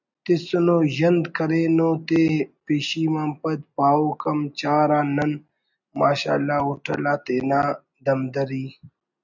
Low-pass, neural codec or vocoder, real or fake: 7.2 kHz; none; real